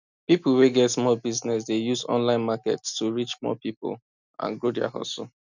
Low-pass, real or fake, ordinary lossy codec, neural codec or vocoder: 7.2 kHz; real; none; none